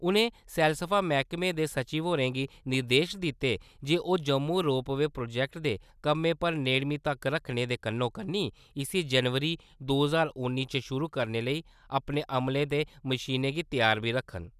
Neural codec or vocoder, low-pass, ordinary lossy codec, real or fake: none; 14.4 kHz; none; real